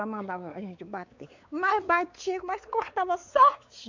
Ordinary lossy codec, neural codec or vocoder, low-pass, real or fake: MP3, 64 kbps; codec, 16 kHz, 4 kbps, X-Codec, WavLM features, trained on Multilingual LibriSpeech; 7.2 kHz; fake